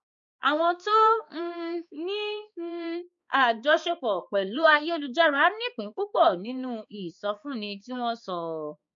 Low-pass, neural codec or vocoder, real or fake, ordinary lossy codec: 7.2 kHz; codec, 16 kHz, 4 kbps, X-Codec, HuBERT features, trained on balanced general audio; fake; MP3, 48 kbps